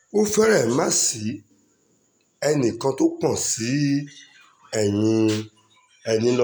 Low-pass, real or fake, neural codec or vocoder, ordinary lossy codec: none; real; none; none